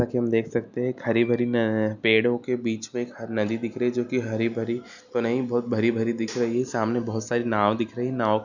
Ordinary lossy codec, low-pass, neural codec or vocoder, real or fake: none; 7.2 kHz; none; real